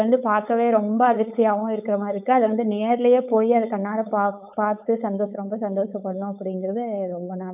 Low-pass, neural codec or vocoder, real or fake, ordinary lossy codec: 3.6 kHz; codec, 16 kHz, 4.8 kbps, FACodec; fake; none